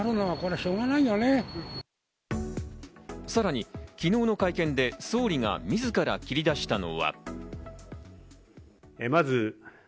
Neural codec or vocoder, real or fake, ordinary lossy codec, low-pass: none; real; none; none